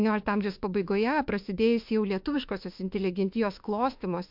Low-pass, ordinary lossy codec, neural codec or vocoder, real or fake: 5.4 kHz; MP3, 48 kbps; autoencoder, 48 kHz, 32 numbers a frame, DAC-VAE, trained on Japanese speech; fake